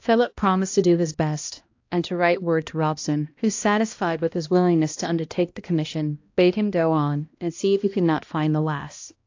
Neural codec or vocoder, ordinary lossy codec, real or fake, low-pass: codec, 16 kHz, 1 kbps, X-Codec, HuBERT features, trained on balanced general audio; AAC, 48 kbps; fake; 7.2 kHz